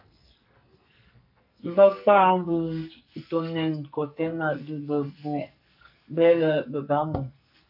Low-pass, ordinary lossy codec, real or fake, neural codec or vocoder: 5.4 kHz; AAC, 48 kbps; fake; codec, 44.1 kHz, 2.6 kbps, SNAC